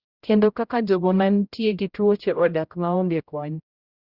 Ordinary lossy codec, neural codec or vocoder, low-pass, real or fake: Opus, 64 kbps; codec, 16 kHz, 0.5 kbps, X-Codec, HuBERT features, trained on general audio; 5.4 kHz; fake